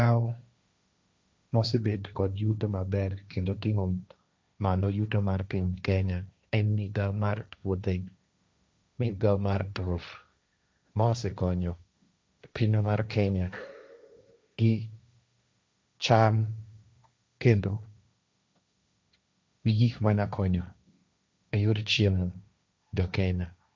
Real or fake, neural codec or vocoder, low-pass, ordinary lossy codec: fake; codec, 16 kHz, 1.1 kbps, Voila-Tokenizer; none; none